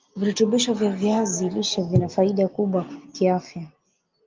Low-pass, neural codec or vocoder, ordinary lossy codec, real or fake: 7.2 kHz; none; Opus, 32 kbps; real